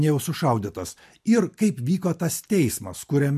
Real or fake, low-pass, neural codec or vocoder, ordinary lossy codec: real; 14.4 kHz; none; MP3, 64 kbps